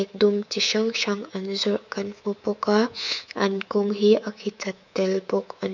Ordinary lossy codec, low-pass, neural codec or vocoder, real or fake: none; 7.2 kHz; codec, 24 kHz, 3.1 kbps, DualCodec; fake